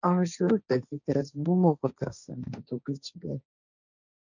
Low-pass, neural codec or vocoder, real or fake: 7.2 kHz; codec, 16 kHz, 1.1 kbps, Voila-Tokenizer; fake